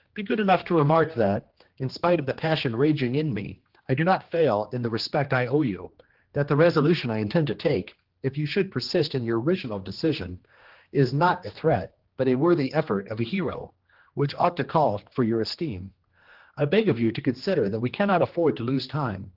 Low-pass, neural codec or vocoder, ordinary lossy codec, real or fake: 5.4 kHz; codec, 16 kHz, 2 kbps, X-Codec, HuBERT features, trained on general audio; Opus, 16 kbps; fake